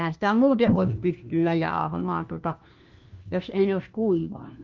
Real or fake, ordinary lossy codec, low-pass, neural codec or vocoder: fake; Opus, 32 kbps; 7.2 kHz; codec, 16 kHz, 1 kbps, FunCodec, trained on LibriTTS, 50 frames a second